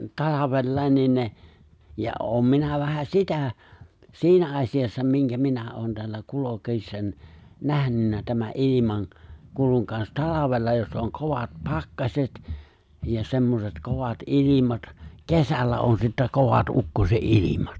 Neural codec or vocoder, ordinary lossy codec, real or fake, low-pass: none; none; real; none